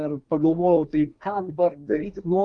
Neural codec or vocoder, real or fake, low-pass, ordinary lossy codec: codec, 24 kHz, 1 kbps, SNAC; fake; 9.9 kHz; Opus, 32 kbps